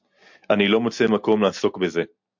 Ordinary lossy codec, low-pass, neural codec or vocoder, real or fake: MP3, 64 kbps; 7.2 kHz; none; real